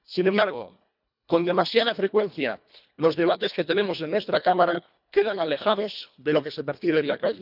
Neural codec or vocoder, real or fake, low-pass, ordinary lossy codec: codec, 24 kHz, 1.5 kbps, HILCodec; fake; 5.4 kHz; none